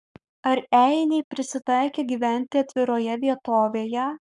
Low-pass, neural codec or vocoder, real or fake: 10.8 kHz; codec, 44.1 kHz, 7.8 kbps, Pupu-Codec; fake